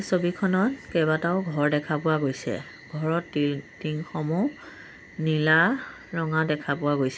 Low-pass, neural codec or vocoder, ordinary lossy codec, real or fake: none; none; none; real